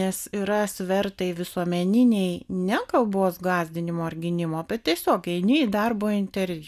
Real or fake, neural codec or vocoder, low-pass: real; none; 14.4 kHz